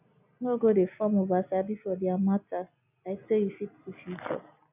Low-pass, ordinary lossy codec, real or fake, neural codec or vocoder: 3.6 kHz; none; real; none